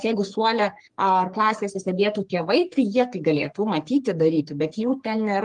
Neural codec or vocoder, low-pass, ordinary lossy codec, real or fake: codec, 44.1 kHz, 3.4 kbps, Pupu-Codec; 10.8 kHz; Opus, 24 kbps; fake